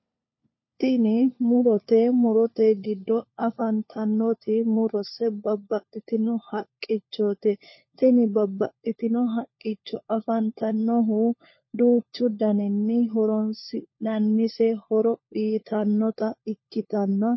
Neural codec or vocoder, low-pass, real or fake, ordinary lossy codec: codec, 16 kHz, 4 kbps, FunCodec, trained on LibriTTS, 50 frames a second; 7.2 kHz; fake; MP3, 24 kbps